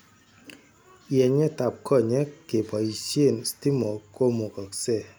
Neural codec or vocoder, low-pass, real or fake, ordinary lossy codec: none; none; real; none